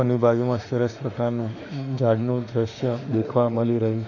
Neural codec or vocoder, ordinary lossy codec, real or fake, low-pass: autoencoder, 48 kHz, 32 numbers a frame, DAC-VAE, trained on Japanese speech; none; fake; 7.2 kHz